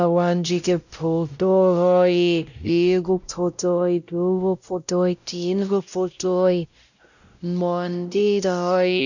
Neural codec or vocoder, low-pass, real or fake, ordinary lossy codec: codec, 16 kHz, 0.5 kbps, X-Codec, WavLM features, trained on Multilingual LibriSpeech; 7.2 kHz; fake; none